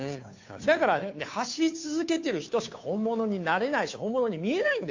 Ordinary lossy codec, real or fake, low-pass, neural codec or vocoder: AAC, 48 kbps; fake; 7.2 kHz; codec, 16 kHz, 2 kbps, FunCodec, trained on Chinese and English, 25 frames a second